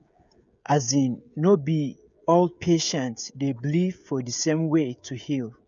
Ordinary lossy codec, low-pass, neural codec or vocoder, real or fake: none; 7.2 kHz; codec, 16 kHz, 16 kbps, FreqCodec, smaller model; fake